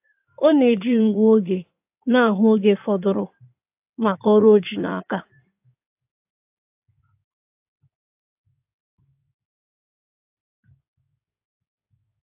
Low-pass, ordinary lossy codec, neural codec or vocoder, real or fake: 3.6 kHz; AAC, 32 kbps; codec, 16 kHz in and 24 kHz out, 2.2 kbps, FireRedTTS-2 codec; fake